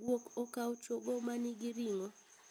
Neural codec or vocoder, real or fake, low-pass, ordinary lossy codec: none; real; none; none